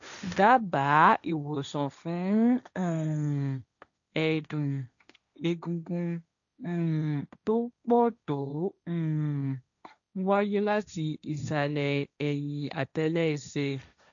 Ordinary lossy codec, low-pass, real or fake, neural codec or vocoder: none; 7.2 kHz; fake; codec, 16 kHz, 1.1 kbps, Voila-Tokenizer